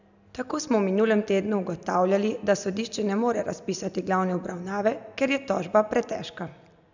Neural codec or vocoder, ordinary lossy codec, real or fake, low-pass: none; none; real; 7.2 kHz